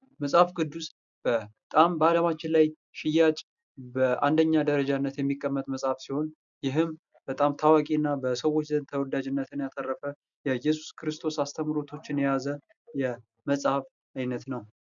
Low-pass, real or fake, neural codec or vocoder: 7.2 kHz; real; none